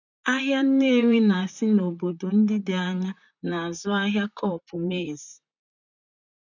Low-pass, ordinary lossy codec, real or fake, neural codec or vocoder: 7.2 kHz; none; fake; vocoder, 44.1 kHz, 128 mel bands, Pupu-Vocoder